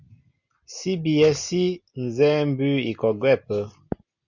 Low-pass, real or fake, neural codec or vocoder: 7.2 kHz; real; none